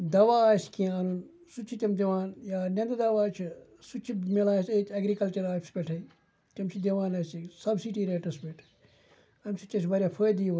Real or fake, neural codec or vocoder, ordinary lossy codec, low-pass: real; none; none; none